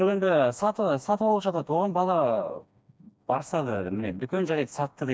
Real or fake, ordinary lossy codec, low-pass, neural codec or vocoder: fake; none; none; codec, 16 kHz, 2 kbps, FreqCodec, smaller model